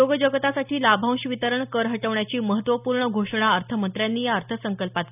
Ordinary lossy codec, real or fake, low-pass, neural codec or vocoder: none; real; 3.6 kHz; none